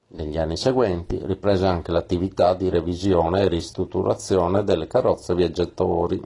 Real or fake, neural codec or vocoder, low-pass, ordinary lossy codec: real; none; 10.8 kHz; AAC, 32 kbps